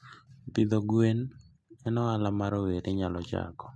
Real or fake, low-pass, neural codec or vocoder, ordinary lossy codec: real; none; none; none